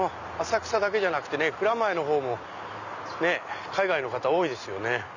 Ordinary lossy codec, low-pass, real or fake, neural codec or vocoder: none; 7.2 kHz; real; none